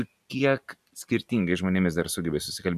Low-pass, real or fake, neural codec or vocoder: 14.4 kHz; real; none